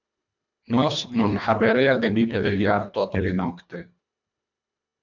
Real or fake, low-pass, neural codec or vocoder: fake; 7.2 kHz; codec, 24 kHz, 1.5 kbps, HILCodec